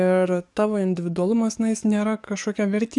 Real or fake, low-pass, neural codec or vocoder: fake; 10.8 kHz; codec, 44.1 kHz, 7.8 kbps, DAC